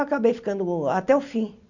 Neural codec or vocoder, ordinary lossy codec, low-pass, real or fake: none; Opus, 64 kbps; 7.2 kHz; real